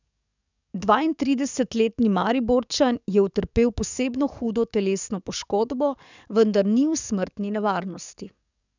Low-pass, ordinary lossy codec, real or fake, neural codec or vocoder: 7.2 kHz; none; fake; codec, 44.1 kHz, 7.8 kbps, DAC